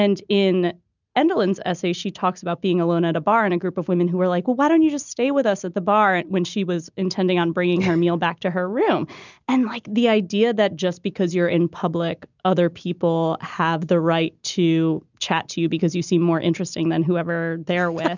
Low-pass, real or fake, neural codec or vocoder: 7.2 kHz; real; none